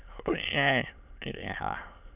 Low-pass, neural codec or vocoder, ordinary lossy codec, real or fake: 3.6 kHz; autoencoder, 22.05 kHz, a latent of 192 numbers a frame, VITS, trained on many speakers; none; fake